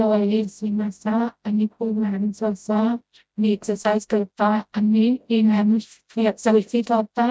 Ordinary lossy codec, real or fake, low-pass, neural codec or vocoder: none; fake; none; codec, 16 kHz, 0.5 kbps, FreqCodec, smaller model